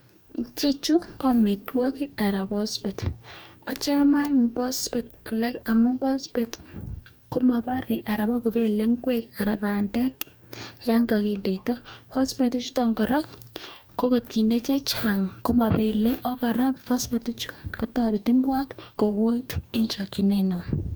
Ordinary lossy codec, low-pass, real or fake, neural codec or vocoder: none; none; fake; codec, 44.1 kHz, 2.6 kbps, DAC